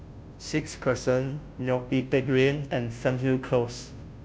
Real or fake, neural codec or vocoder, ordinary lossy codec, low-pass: fake; codec, 16 kHz, 0.5 kbps, FunCodec, trained on Chinese and English, 25 frames a second; none; none